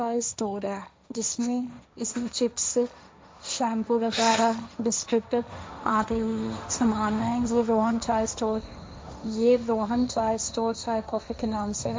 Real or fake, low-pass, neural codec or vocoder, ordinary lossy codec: fake; none; codec, 16 kHz, 1.1 kbps, Voila-Tokenizer; none